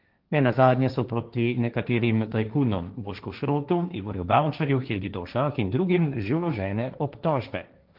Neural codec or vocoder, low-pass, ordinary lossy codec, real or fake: codec, 16 kHz, 1.1 kbps, Voila-Tokenizer; 5.4 kHz; Opus, 32 kbps; fake